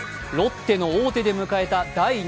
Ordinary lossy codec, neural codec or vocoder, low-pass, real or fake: none; none; none; real